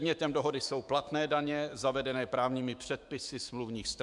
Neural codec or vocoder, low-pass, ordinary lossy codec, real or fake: autoencoder, 48 kHz, 128 numbers a frame, DAC-VAE, trained on Japanese speech; 10.8 kHz; MP3, 96 kbps; fake